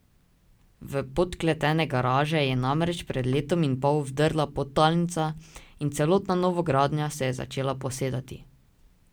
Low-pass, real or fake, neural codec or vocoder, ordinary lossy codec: none; real; none; none